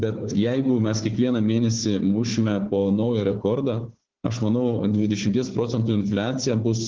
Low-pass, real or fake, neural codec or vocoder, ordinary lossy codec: 7.2 kHz; fake; codec, 16 kHz, 4 kbps, FunCodec, trained on Chinese and English, 50 frames a second; Opus, 16 kbps